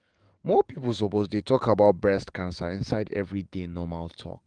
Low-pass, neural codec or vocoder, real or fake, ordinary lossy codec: 9.9 kHz; codec, 44.1 kHz, 7.8 kbps, DAC; fake; Opus, 24 kbps